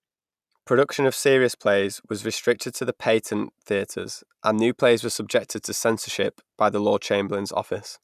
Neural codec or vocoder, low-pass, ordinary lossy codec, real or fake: none; 14.4 kHz; none; real